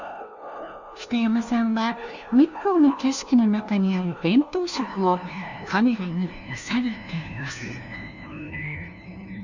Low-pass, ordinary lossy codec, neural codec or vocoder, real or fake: 7.2 kHz; none; codec, 16 kHz, 1 kbps, FunCodec, trained on LibriTTS, 50 frames a second; fake